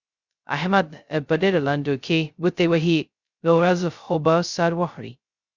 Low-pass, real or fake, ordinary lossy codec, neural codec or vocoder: 7.2 kHz; fake; Opus, 64 kbps; codec, 16 kHz, 0.2 kbps, FocalCodec